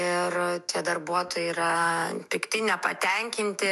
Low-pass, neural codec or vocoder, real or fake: 14.4 kHz; autoencoder, 48 kHz, 128 numbers a frame, DAC-VAE, trained on Japanese speech; fake